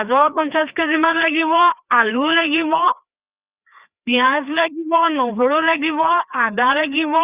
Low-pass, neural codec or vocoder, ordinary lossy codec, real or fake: 3.6 kHz; codec, 16 kHz, 2 kbps, FreqCodec, larger model; Opus, 64 kbps; fake